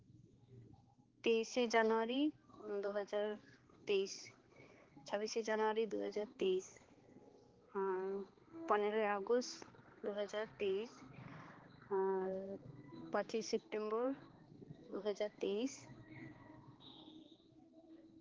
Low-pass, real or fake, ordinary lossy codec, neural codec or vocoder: 7.2 kHz; fake; Opus, 16 kbps; codec, 16 kHz, 2 kbps, X-Codec, HuBERT features, trained on balanced general audio